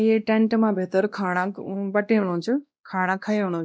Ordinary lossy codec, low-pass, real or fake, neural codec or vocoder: none; none; fake; codec, 16 kHz, 1 kbps, X-Codec, WavLM features, trained on Multilingual LibriSpeech